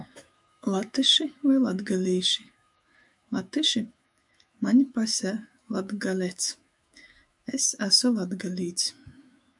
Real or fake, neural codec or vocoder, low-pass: fake; autoencoder, 48 kHz, 128 numbers a frame, DAC-VAE, trained on Japanese speech; 10.8 kHz